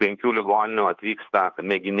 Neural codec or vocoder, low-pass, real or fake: codec, 16 kHz, 2 kbps, FunCodec, trained on Chinese and English, 25 frames a second; 7.2 kHz; fake